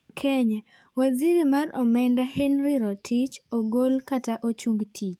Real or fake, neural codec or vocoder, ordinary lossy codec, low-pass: fake; codec, 44.1 kHz, 7.8 kbps, DAC; none; 19.8 kHz